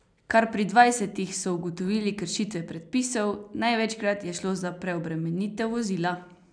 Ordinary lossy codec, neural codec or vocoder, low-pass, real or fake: none; none; 9.9 kHz; real